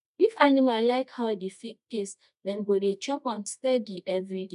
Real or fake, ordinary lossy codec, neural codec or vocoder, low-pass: fake; none; codec, 24 kHz, 0.9 kbps, WavTokenizer, medium music audio release; 10.8 kHz